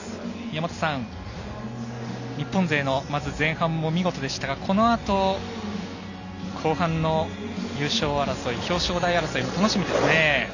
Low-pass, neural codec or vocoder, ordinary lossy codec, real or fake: 7.2 kHz; none; none; real